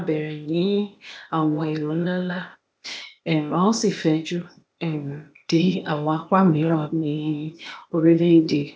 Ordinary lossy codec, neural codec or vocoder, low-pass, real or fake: none; codec, 16 kHz, 0.8 kbps, ZipCodec; none; fake